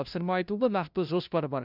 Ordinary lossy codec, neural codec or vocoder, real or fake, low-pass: none; codec, 16 kHz, 0.5 kbps, FunCodec, trained on LibriTTS, 25 frames a second; fake; 5.4 kHz